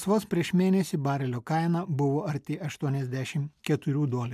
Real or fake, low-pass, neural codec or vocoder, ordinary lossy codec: real; 14.4 kHz; none; MP3, 96 kbps